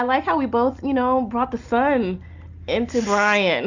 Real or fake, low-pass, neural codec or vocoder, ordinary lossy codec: real; 7.2 kHz; none; Opus, 64 kbps